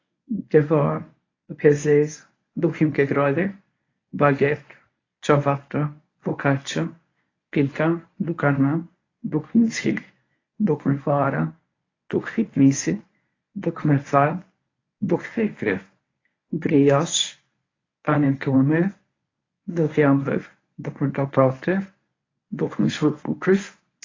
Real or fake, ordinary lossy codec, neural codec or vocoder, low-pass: fake; AAC, 32 kbps; codec, 24 kHz, 0.9 kbps, WavTokenizer, medium speech release version 1; 7.2 kHz